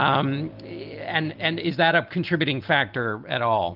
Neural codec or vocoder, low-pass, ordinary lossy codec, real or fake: none; 5.4 kHz; Opus, 32 kbps; real